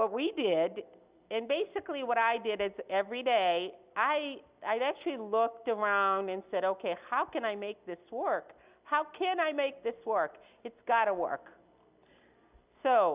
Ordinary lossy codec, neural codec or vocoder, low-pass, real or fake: Opus, 64 kbps; none; 3.6 kHz; real